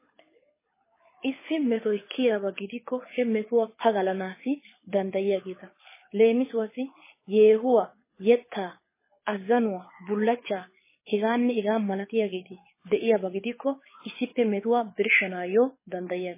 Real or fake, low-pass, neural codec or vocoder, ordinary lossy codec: fake; 3.6 kHz; codec, 24 kHz, 6 kbps, HILCodec; MP3, 16 kbps